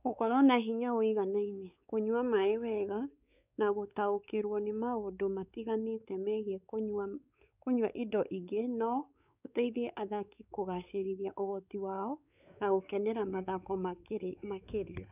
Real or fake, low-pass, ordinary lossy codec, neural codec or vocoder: fake; 3.6 kHz; none; codec, 16 kHz, 4 kbps, X-Codec, WavLM features, trained on Multilingual LibriSpeech